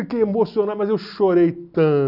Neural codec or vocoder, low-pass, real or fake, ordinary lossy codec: none; 5.4 kHz; real; none